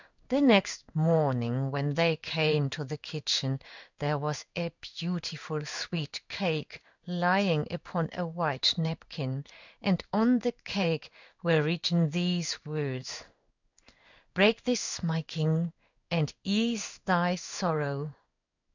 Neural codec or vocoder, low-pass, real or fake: codec, 16 kHz in and 24 kHz out, 1 kbps, XY-Tokenizer; 7.2 kHz; fake